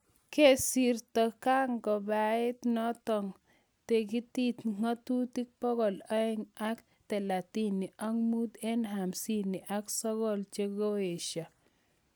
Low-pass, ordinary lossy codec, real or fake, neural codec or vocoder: none; none; real; none